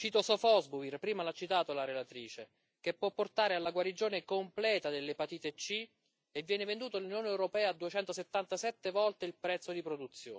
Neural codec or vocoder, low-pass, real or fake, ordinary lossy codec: none; none; real; none